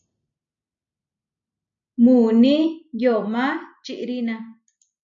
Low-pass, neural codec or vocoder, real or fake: 7.2 kHz; none; real